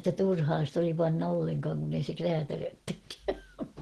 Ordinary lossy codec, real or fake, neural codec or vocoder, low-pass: Opus, 16 kbps; real; none; 10.8 kHz